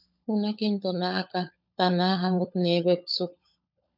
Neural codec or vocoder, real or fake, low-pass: codec, 16 kHz, 16 kbps, FunCodec, trained on LibriTTS, 50 frames a second; fake; 5.4 kHz